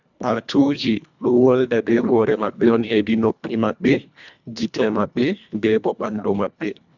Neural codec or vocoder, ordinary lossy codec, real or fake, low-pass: codec, 24 kHz, 1.5 kbps, HILCodec; none; fake; 7.2 kHz